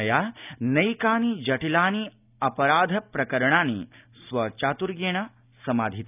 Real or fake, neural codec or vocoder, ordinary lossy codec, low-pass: real; none; none; 3.6 kHz